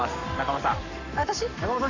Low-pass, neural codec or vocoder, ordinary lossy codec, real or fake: 7.2 kHz; codec, 44.1 kHz, 7.8 kbps, Pupu-Codec; none; fake